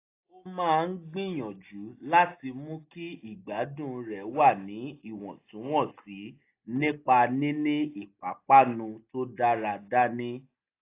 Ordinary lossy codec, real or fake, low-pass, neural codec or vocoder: AAC, 24 kbps; real; 3.6 kHz; none